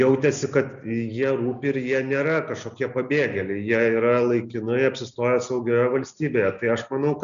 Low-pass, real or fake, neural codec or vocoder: 7.2 kHz; real; none